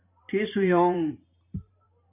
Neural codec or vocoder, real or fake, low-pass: vocoder, 44.1 kHz, 128 mel bands every 512 samples, BigVGAN v2; fake; 3.6 kHz